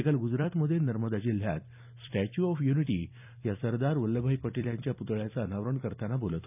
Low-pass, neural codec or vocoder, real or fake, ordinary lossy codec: 3.6 kHz; vocoder, 44.1 kHz, 128 mel bands every 256 samples, BigVGAN v2; fake; none